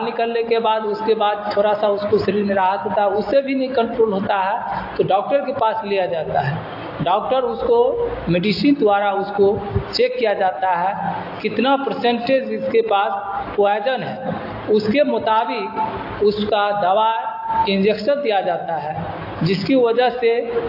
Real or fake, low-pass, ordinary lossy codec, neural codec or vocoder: real; 5.4 kHz; AAC, 32 kbps; none